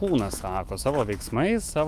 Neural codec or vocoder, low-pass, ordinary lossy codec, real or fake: autoencoder, 48 kHz, 128 numbers a frame, DAC-VAE, trained on Japanese speech; 14.4 kHz; Opus, 32 kbps; fake